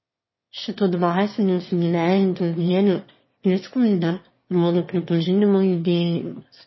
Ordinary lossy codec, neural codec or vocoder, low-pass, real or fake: MP3, 24 kbps; autoencoder, 22.05 kHz, a latent of 192 numbers a frame, VITS, trained on one speaker; 7.2 kHz; fake